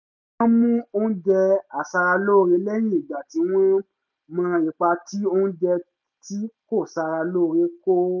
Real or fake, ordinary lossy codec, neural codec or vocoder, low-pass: real; none; none; 7.2 kHz